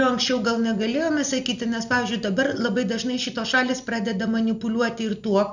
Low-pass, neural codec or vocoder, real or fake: 7.2 kHz; none; real